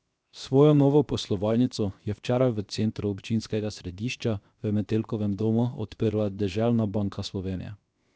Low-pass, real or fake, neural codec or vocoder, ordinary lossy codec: none; fake; codec, 16 kHz, 0.7 kbps, FocalCodec; none